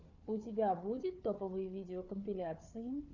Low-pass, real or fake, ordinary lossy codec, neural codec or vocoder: 7.2 kHz; fake; Opus, 64 kbps; codec, 16 kHz, 4 kbps, FunCodec, trained on Chinese and English, 50 frames a second